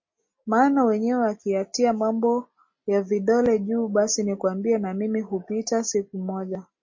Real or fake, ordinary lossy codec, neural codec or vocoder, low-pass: real; MP3, 32 kbps; none; 7.2 kHz